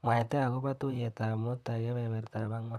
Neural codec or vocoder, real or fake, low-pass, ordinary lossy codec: vocoder, 44.1 kHz, 128 mel bands, Pupu-Vocoder; fake; 14.4 kHz; none